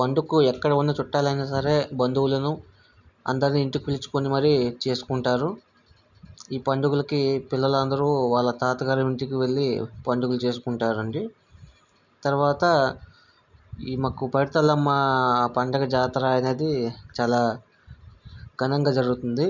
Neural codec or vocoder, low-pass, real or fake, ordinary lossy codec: none; 7.2 kHz; real; none